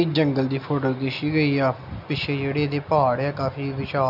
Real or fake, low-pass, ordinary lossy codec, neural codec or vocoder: real; 5.4 kHz; none; none